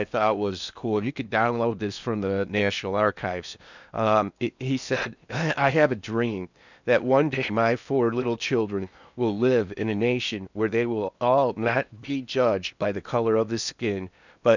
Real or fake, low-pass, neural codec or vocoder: fake; 7.2 kHz; codec, 16 kHz in and 24 kHz out, 0.8 kbps, FocalCodec, streaming, 65536 codes